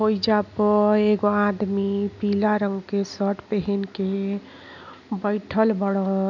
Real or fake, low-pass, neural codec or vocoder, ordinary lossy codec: real; 7.2 kHz; none; none